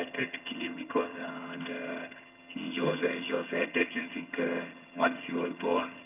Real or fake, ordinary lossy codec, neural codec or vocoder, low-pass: fake; none; vocoder, 22.05 kHz, 80 mel bands, HiFi-GAN; 3.6 kHz